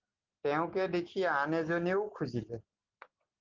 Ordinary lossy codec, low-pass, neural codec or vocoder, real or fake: Opus, 16 kbps; 7.2 kHz; none; real